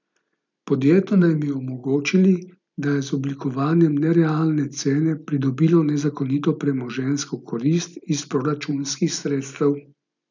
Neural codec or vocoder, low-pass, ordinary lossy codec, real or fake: none; 7.2 kHz; none; real